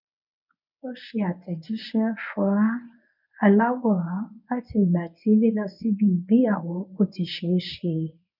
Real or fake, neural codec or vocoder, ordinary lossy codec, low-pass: fake; codec, 24 kHz, 0.9 kbps, WavTokenizer, medium speech release version 1; none; 5.4 kHz